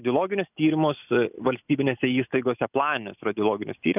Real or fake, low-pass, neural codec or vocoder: real; 3.6 kHz; none